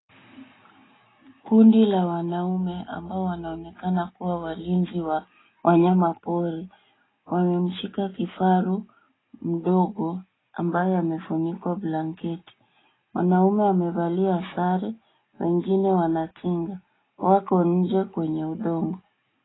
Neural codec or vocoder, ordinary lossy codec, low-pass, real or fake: none; AAC, 16 kbps; 7.2 kHz; real